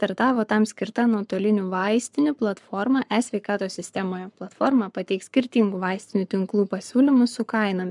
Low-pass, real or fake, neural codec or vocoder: 10.8 kHz; fake; codec, 44.1 kHz, 7.8 kbps, Pupu-Codec